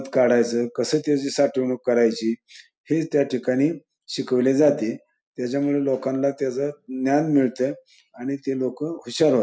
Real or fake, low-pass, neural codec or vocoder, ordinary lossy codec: real; none; none; none